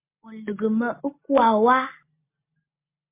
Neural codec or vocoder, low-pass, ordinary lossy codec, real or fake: none; 3.6 kHz; MP3, 24 kbps; real